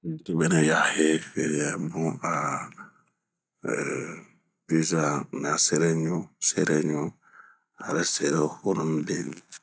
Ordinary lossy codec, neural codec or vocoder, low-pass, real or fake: none; none; none; real